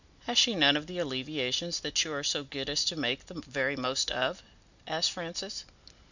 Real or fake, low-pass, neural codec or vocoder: real; 7.2 kHz; none